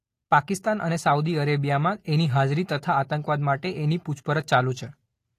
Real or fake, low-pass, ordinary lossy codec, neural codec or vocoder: real; 14.4 kHz; AAC, 48 kbps; none